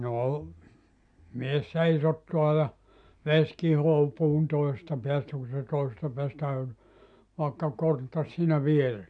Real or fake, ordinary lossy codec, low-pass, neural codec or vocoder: real; MP3, 96 kbps; 9.9 kHz; none